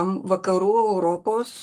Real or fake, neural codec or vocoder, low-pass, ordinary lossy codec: fake; codec, 44.1 kHz, 7.8 kbps, Pupu-Codec; 14.4 kHz; Opus, 24 kbps